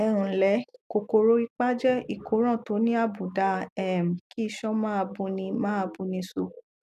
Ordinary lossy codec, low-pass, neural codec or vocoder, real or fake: none; 14.4 kHz; none; real